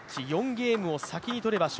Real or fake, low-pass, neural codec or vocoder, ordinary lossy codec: real; none; none; none